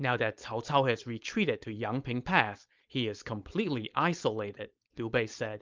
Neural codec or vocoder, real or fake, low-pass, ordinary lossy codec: codec, 16 kHz, 4.8 kbps, FACodec; fake; 7.2 kHz; Opus, 32 kbps